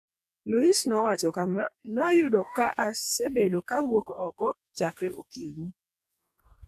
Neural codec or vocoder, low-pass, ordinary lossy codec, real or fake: codec, 44.1 kHz, 2.6 kbps, DAC; 14.4 kHz; none; fake